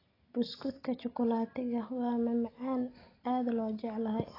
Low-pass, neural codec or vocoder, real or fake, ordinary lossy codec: 5.4 kHz; none; real; AAC, 24 kbps